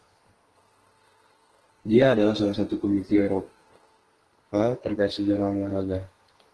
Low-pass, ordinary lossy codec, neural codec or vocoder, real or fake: 10.8 kHz; Opus, 16 kbps; codec, 32 kHz, 1.9 kbps, SNAC; fake